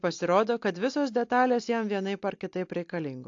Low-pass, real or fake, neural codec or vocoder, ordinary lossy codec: 7.2 kHz; real; none; AAC, 48 kbps